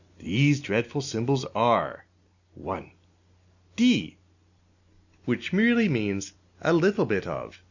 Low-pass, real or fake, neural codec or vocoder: 7.2 kHz; real; none